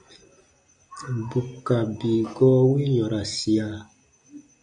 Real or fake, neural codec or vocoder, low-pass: real; none; 9.9 kHz